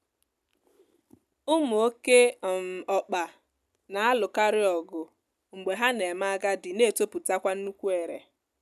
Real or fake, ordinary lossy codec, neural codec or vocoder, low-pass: real; none; none; 14.4 kHz